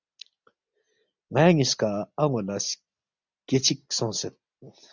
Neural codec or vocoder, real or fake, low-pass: none; real; 7.2 kHz